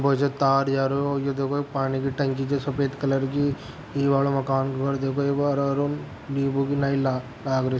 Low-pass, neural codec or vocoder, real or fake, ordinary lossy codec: none; none; real; none